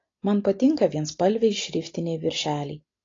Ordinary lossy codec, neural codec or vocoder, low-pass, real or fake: AAC, 32 kbps; none; 7.2 kHz; real